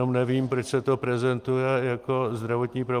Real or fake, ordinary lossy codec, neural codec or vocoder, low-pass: real; Opus, 32 kbps; none; 14.4 kHz